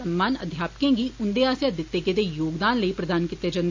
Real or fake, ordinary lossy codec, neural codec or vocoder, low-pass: real; MP3, 64 kbps; none; 7.2 kHz